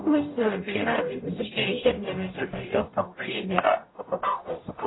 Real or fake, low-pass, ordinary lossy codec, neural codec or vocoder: fake; 7.2 kHz; AAC, 16 kbps; codec, 44.1 kHz, 0.9 kbps, DAC